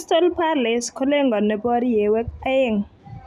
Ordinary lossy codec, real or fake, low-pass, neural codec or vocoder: none; real; 14.4 kHz; none